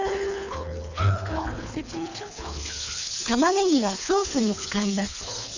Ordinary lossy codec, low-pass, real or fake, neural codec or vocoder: none; 7.2 kHz; fake; codec, 24 kHz, 3 kbps, HILCodec